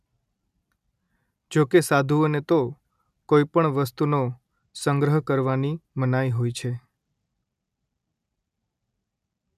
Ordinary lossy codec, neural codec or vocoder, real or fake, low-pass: none; none; real; 14.4 kHz